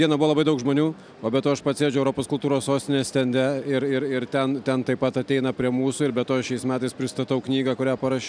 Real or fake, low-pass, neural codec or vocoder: real; 9.9 kHz; none